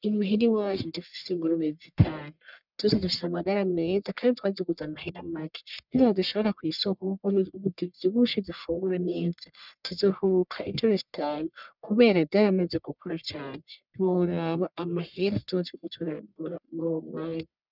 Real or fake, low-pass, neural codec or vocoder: fake; 5.4 kHz; codec, 44.1 kHz, 1.7 kbps, Pupu-Codec